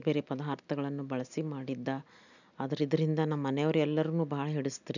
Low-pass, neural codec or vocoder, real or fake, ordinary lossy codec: 7.2 kHz; none; real; none